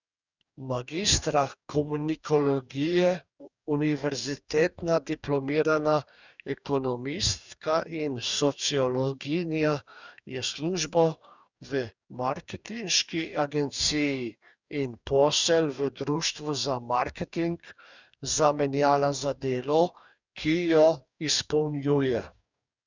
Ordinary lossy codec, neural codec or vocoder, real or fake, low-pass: none; codec, 44.1 kHz, 2.6 kbps, DAC; fake; 7.2 kHz